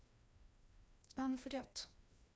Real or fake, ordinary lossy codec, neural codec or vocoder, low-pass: fake; none; codec, 16 kHz, 1 kbps, FreqCodec, larger model; none